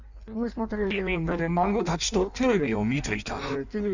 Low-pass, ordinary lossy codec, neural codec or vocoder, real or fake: 7.2 kHz; none; codec, 16 kHz in and 24 kHz out, 1.1 kbps, FireRedTTS-2 codec; fake